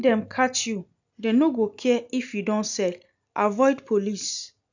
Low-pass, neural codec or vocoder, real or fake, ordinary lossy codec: 7.2 kHz; none; real; none